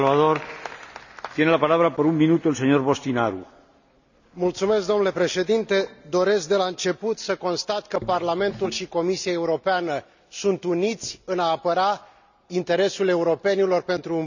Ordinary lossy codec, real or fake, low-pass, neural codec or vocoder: none; real; 7.2 kHz; none